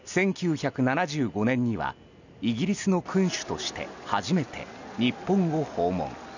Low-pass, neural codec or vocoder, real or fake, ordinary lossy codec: 7.2 kHz; none; real; none